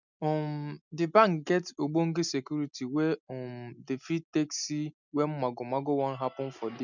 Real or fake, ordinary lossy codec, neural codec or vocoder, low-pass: real; none; none; 7.2 kHz